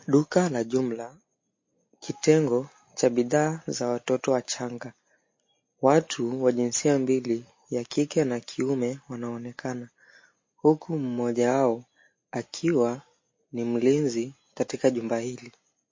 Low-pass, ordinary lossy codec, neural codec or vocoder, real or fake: 7.2 kHz; MP3, 32 kbps; none; real